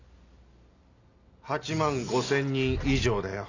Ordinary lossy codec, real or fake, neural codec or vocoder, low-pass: none; real; none; 7.2 kHz